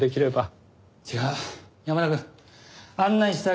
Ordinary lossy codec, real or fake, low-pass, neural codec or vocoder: none; real; none; none